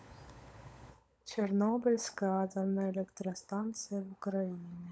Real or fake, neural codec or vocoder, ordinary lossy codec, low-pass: fake; codec, 16 kHz, 16 kbps, FunCodec, trained on LibriTTS, 50 frames a second; none; none